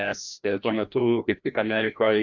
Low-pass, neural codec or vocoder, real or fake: 7.2 kHz; codec, 16 kHz, 1 kbps, FreqCodec, larger model; fake